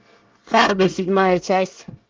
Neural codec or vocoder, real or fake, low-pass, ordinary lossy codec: codec, 24 kHz, 1 kbps, SNAC; fake; 7.2 kHz; Opus, 24 kbps